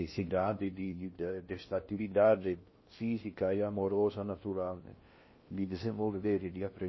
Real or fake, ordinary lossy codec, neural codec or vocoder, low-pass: fake; MP3, 24 kbps; codec, 16 kHz in and 24 kHz out, 0.6 kbps, FocalCodec, streaming, 2048 codes; 7.2 kHz